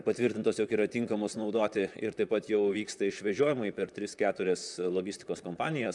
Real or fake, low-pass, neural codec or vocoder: fake; 10.8 kHz; vocoder, 44.1 kHz, 128 mel bands, Pupu-Vocoder